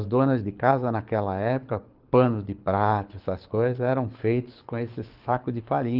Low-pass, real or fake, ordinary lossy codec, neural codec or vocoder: 5.4 kHz; fake; Opus, 32 kbps; codec, 24 kHz, 6 kbps, HILCodec